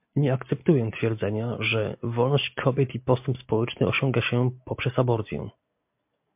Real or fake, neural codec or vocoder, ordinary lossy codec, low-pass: real; none; MP3, 32 kbps; 3.6 kHz